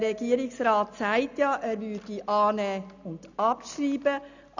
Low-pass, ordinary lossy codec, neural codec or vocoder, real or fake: 7.2 kHz; none; none; real